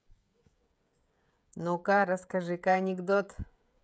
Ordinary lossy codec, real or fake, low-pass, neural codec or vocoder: none; fake; none; codec, 16 kHz, 16 kbps, FreqCodec, smaller model